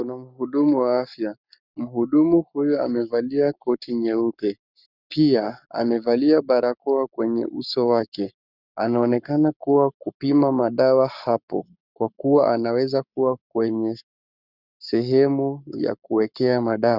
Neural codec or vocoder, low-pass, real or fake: codec, 44.1 kHz, 7.8 kbps, Pupu-Codec; 5.4 kHz; fake